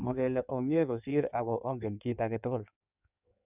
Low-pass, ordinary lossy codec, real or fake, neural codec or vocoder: 3.6 kHz; none; fake; codec, 16 kHz in and 24 kHz out, 1.1 kbps, FireRedTTS-2 codec